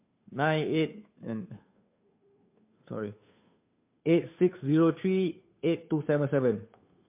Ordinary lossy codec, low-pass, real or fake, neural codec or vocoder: MP3, 32 kbps; 3.6 kHz; fake; codec, 16 kHz, 2 kbps, FunCodec, trained on Chinese and English, 25 frames a second